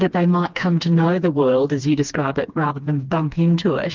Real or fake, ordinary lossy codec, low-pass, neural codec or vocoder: fake; Opus, 32 kbps; 7.2 kHz; codec, 16 kHz, 2 kbps, FreqCodec, smaller model